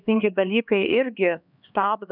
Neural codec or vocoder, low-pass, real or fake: codec, 16 kHz, 2 kbps, X-Codec, HuBERT features, trained on LibriSpeech; 5.4 kHz; fake